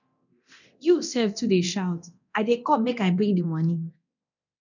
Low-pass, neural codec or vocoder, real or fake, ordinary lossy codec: 7.2 kHz; codec, 24 kHz, 0.9 kbps, DualCodec; fake; none